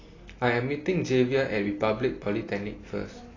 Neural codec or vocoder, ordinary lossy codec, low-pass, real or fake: none; AAC, 32 kbps; 7.2 kHz; real